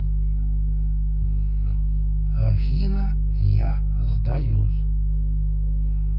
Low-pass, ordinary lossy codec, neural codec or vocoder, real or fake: 5.4 kHz; none; codec, 44.1 kHz, 2.6 kbps, SNAC; fake